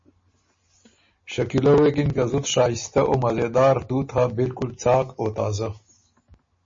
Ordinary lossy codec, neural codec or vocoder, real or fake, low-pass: MP3, 32 kbps; none; real; 7.2 kHz